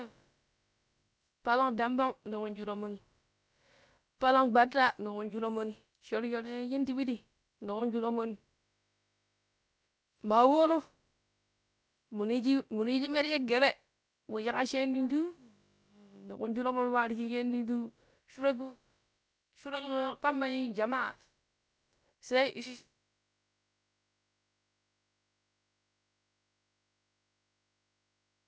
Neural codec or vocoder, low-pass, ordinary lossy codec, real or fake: codec, 16 kHz, about 1 kbps, DyCAST, with the encoder's durations; none; none; fake